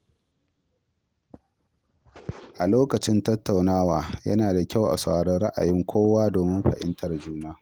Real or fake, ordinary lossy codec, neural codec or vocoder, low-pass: real; Opus, 24 kbps; none; 19.8 kHz